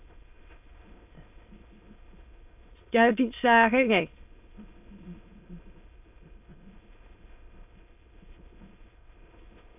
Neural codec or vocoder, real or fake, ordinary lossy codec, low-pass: autoencoder, 22.05 kHz, a latent of 192 numbers a frame, VITS, trained on many speakers; fake; AAC, 32 kbps; 3.6 kHz